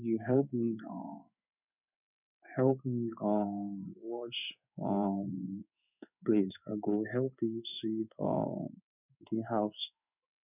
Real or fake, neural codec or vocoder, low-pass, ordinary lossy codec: fake; codec, 16 kHz in and 24 kHz out, 1 kbps, XY-Tokenizer; 3.6 kHz; none